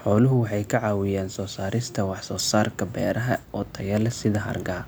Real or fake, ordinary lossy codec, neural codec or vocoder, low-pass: real; none; none; none